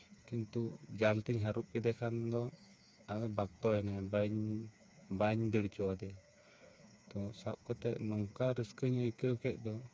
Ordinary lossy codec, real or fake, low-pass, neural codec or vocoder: none; fake; none; codec, 16 kHz, 4 kbps, FreqCodec, smaller model